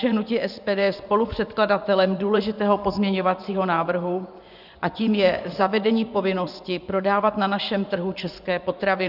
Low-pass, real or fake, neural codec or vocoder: 5.4 kHz; fake; vocoder, 24 kHz, 100 mel bands, Vocos